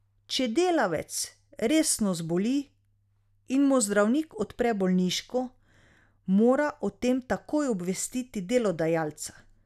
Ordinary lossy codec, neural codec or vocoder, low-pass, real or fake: none; none; 14.4 kHz; real